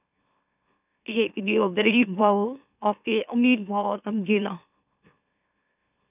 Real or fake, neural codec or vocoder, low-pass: fake; autoencoder, 44.1 kHz, a latent of 192 numbers a frame, MeloTTS; 3.6 kHz